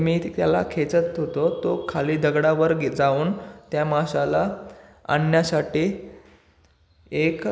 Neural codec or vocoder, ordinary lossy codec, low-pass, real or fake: none; none; none; real